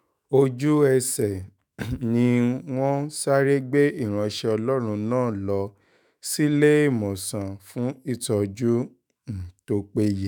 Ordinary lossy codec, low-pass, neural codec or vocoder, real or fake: none; none; autoencoder, 48 kHz, 128 numbers a frame, DAC-VAE, trained on Japanese speech; fake